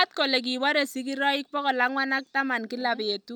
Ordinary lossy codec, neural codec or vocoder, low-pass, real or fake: none; none; none; real